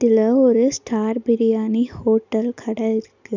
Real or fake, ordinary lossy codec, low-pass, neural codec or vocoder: real; none; 7.2 kHz; none